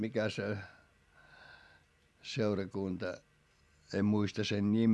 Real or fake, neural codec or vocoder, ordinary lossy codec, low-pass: real; none; none; none